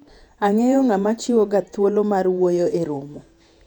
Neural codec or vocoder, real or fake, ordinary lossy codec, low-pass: vocoder, 48 kHz, 128 mel bands, Vocos; fake; none; 19.8 kHz